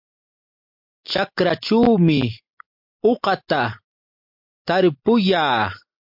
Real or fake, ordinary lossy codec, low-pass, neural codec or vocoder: real; MP3, 48 kbps; 5.4 kHz; none